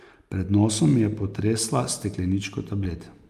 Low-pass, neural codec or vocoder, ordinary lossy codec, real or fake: 14.4 kHz; none; Opus, 32 kbps; real